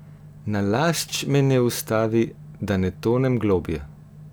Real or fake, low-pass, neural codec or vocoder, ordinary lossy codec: real; none; none; none